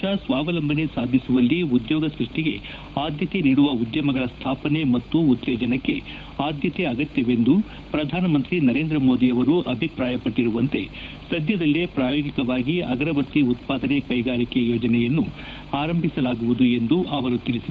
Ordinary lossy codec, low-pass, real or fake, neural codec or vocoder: none; none; fake; codec, 16 kHz, 8 kbps, FunCodec, trained on Chinese and English, 25 frames a second